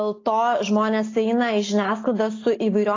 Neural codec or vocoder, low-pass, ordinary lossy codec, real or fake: none; 7.2 kHz; AAC, 32 kbps; real